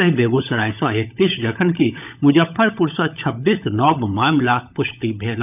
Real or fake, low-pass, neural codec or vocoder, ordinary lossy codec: fake; 3.6 kHz; codec, 16 kHz, 16 kbps, FunCodec, trained on LibriTTS, 50 frames a second; none